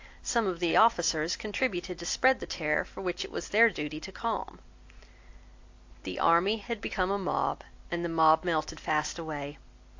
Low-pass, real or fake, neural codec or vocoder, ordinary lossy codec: 7.2 kHz; real; none; AAC, 48 kbps